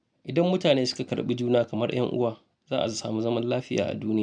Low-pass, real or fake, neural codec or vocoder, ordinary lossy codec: 9.9 kHz; real; none; none